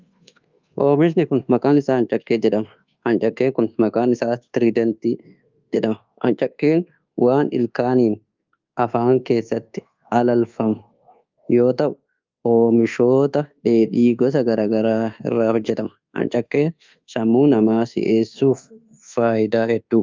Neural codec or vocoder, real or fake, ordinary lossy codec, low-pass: codec, 24 kHz, 1.2 kbps, DualCodec; fake; Opus, 32 kbps; 7.2 kHz